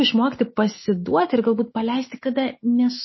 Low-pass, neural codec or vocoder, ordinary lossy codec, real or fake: 7.2 kHz; autoencoder, 48 kHz, 128 numbers a frame, DAC-VAE, trained on Japanese speech; MP3, 24 kbps; fake